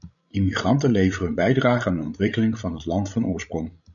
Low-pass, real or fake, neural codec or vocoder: 7.2 kHz; fake; codec, 16 kHz, 16 kbps, FreqCodec, larger model